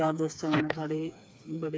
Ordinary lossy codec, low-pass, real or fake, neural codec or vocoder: none; none; fake; codec, 16 kHz, 4 kbps, FreqCodec, smaller model